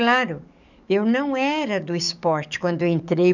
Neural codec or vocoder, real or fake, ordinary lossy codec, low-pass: codec, 44.1 kHz, 7.8 kbps, DAC; fake; none; 7.2 kHz